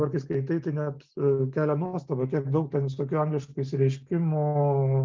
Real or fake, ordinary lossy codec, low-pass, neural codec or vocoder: real; Opus, 24 kbps; 7.2 kHz; none